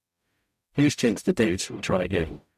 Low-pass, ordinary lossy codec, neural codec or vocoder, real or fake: 14.4 kHz; none; codec, 44.1 kHz, 0.9 kbps, DAC; fake